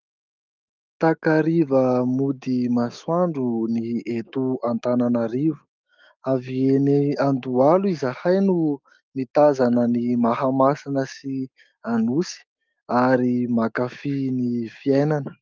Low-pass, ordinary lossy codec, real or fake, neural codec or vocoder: 7.2 kHz; Opus, 24 kbps; fake; autoencoder, 48 kHz, 128 numbers a frame, DAC-VAE, trained on Japanese speech